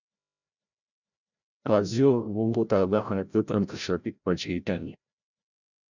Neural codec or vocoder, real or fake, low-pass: codec, 16 kHz, 0.5 kbps, FreqCodec, larger model; fake; 7.2 kHz